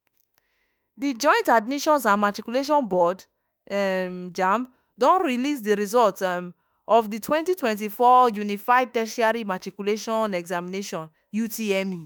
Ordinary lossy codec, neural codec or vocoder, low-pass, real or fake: none; autoencoder, 48 kHz, 32 numbers a frame, DAC-VAE, trained on Japanese speech; none; fake